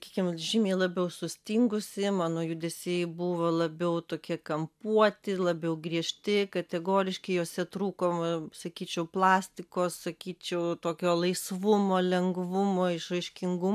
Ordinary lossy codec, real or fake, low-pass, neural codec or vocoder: AAC, 96 kbps; real; 14.4 kHz; none